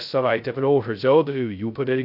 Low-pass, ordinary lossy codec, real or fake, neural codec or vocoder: 5.4 kHz; AAC, 48 kbps; fake; codec, 16 kHz, 0.2 kbps, FocalCodec